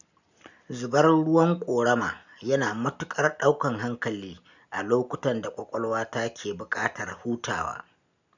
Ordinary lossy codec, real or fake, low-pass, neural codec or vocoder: none; real; 7.2 kHz; none